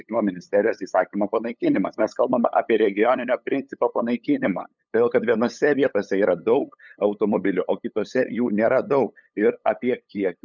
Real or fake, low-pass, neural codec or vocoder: fake; 7.2 kHz; codec, 16 kHz, 8 kbps, FunCodec, trained on LibriTTS, 25 frames a second